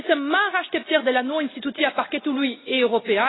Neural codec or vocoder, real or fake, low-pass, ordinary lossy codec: none; real; 7.2 kHz; AAC, 16 kbps